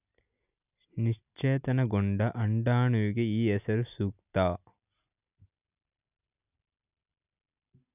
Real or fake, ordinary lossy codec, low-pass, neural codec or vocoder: real; none; 3.6 kHz; none